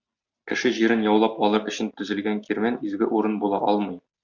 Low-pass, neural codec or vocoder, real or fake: 7.2 kHz; none; real